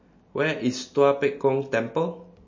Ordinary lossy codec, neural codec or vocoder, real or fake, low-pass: MP3, 32 kbps; none; real; 7.2 kHz